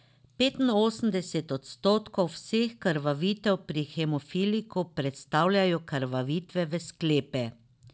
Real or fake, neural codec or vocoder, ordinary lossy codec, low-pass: real; none; none; none